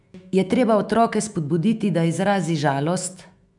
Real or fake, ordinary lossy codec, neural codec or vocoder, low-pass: fake; none; autoencoder, 48 kHz, 128 numbers a frame, DAC-VAE, trained on Japanese speech; 10.8 kHz